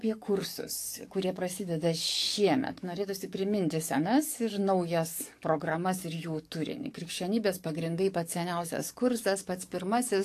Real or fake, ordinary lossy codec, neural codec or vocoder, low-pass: fake; AAC, 64 kbps; codec, 44.1 kHz, 7.8 kbps, DAC; 14.4 kHz